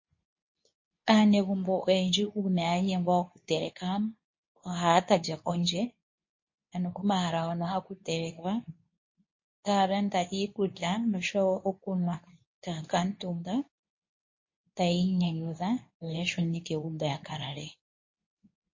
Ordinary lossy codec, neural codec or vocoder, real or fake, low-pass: MP3, 32 kbps; codec, 24 kHz, 0.9 kbps, WavTokenizer, medium speech release version 2; fake; 7.2 kHz